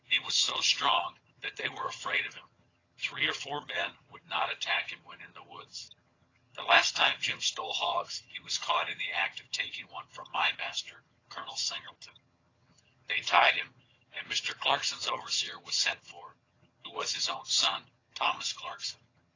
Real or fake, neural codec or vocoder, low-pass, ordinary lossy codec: fake; vocoder, 22.05 kHz, 80 mel bands, HiFi-GAN; 7.2 kHz; AAC, 32 kbps